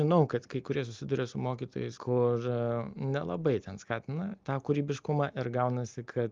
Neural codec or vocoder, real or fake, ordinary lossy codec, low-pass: none; real; Opus, 32 kbps; 7.2 kHz